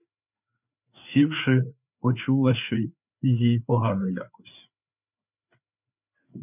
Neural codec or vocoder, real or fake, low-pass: codec, 16 kHz, 4 kbps, FreqCodec, larger model; fake; 3.6 kHz